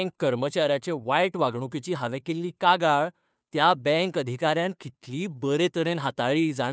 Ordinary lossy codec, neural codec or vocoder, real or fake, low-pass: none; codec, 16 kHz, 2 kbps, FunCodec, trained on Chinese and English, 25 frames a second; fake; none